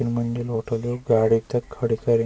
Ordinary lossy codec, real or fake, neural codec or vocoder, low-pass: none; real; none; none